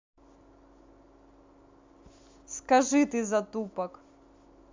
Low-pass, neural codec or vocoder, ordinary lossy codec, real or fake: 7.2 kHz; none; MP3, 64 kbps; real